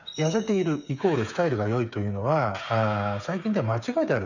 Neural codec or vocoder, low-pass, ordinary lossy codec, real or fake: codec, 16 kHz, 8 kbps, FreqCodec, smaller model; 7.2 kHz; none; fake